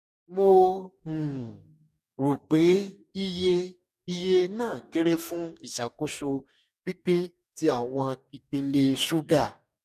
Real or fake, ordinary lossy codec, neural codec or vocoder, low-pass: fake; none; codec, 44.1 kHz, 2.6 kbps, DAC; 14.4 kHz